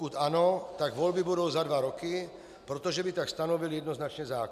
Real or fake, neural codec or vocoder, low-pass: real; none; 14.4 kHz